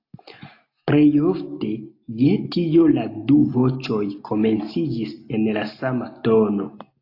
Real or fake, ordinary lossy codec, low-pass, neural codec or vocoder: real; AAC, 32 kbps; 5.4 kHz; none